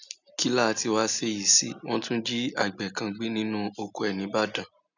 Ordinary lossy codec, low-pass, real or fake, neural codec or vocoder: none; 7.2 kHz; real; none